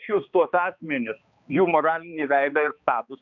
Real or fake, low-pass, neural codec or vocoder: fake; 7.2 kHz; codec, 16 kHz, 2 kbps, X-Codec, HuBERT features, trained on balanced general audio